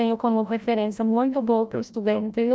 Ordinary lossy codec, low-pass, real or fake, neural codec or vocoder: none; none; fake; codec, 16 kHz, 0.5 kbps, FreqCodec, larger model